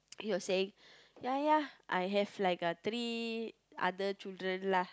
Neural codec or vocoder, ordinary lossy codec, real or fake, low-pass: none; none; real; none